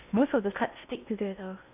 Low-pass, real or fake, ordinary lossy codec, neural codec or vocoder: 3.6 kHz; fake; AAC, 32 kbps; codec, 16 kHz in and 24 kHz out, 0.8 kbps, FocalCodec, streaming, 65536 codes